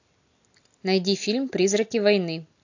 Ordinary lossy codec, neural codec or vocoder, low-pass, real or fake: AAC, 48 kbps; none; 7.2 kHz; real